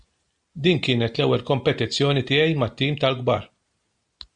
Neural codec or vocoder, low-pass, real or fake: none; 9.9 kHz; real